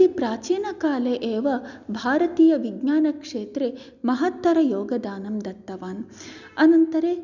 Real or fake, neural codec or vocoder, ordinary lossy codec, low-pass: real; none; none; 7.2 kHz